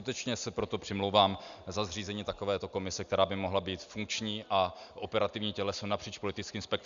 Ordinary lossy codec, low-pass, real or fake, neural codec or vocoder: Opus, 64 kbps; 7.2 kHz; real; none